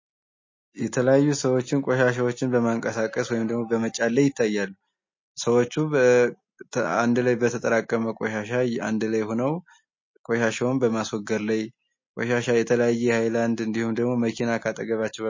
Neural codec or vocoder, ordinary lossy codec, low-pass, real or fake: none; MP3, 32 kbps; 7.2 kHz; real